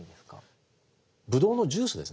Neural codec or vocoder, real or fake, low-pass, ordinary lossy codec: none; real; none; none